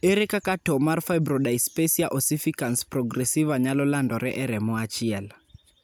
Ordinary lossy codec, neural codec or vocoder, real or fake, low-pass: none; none; real; none